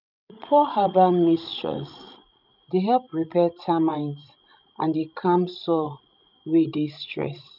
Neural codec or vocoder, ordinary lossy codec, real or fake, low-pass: codec, 16 kHz, 16 kbps, FreqCodec, larger model; none; fake; 5.4 kHz